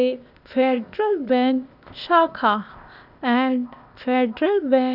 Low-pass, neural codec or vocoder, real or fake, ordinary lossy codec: 5.4 kHz; codec, 16 kHz, 0.8 kbps, ZipCodec; fake; none